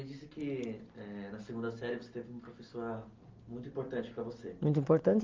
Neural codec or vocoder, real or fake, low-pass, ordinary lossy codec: none; real; 7.2 kHz; Opus, 32 kbps